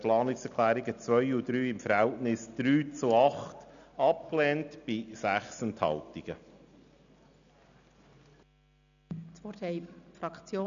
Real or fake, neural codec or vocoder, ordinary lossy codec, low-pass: real; none; none; 7.2 kHz